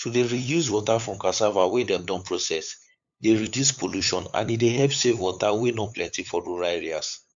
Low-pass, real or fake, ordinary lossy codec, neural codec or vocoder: 7.2 kHz; fake; MP3, 64 kbps; codec, 16 kHz, 8 kbps, FunCodec, trained on LibriTTS, 25 frames a second